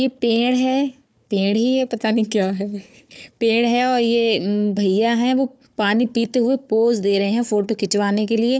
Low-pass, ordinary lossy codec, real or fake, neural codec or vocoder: none; none; fake; codec, 16 kHz, 4 kbps, FunCodec, trained on Chinese and English, 50 frames a second